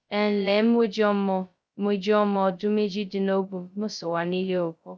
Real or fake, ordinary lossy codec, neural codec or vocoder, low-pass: fake; none; codec, 16 kHz, 0.2 kbps, FocalCodec; none